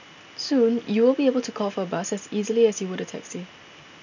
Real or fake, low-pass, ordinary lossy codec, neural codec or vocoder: real; 7.2 kHz; none; none